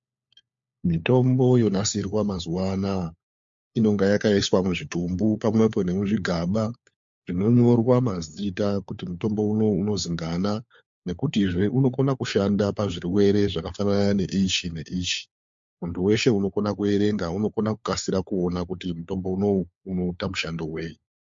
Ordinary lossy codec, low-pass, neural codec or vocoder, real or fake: MP3, 48 kbps; 7.2 kHz; codec, 16 kHz, 4 kbps, FunCodec, trained on LibriTTS, 50 frames a second; fake